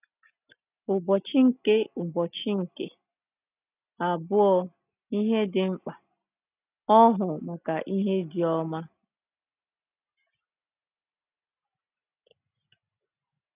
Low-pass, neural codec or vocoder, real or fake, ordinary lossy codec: 3.6 kHz; none; real; none